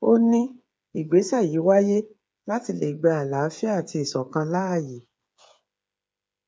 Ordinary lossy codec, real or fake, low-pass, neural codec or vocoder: none; fake; none; codec, 16 kHz, 8 kbps, FreqCodec, smaller model